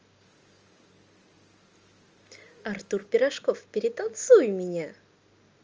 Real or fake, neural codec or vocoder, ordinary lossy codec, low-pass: real; none; Opus, 24 kbps; 7.2 kHz